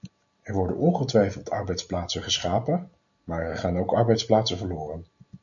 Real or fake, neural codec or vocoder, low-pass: real; none; 7.2 kHz